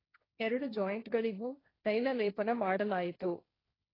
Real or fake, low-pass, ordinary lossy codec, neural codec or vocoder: fake; 5.4 kHz; AAC, 24 kbps; codec, 16 kHz, 1.1 kbps, Voila-Tokenizer